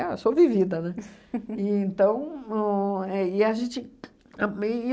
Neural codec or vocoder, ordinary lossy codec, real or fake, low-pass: none; none; real; none